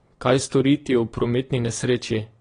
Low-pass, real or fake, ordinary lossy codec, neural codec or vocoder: 9.9 kHz; fake; AAC, 32 kbps; vocoder, 22.05 kHz, 80 mel bands, WaveNeXt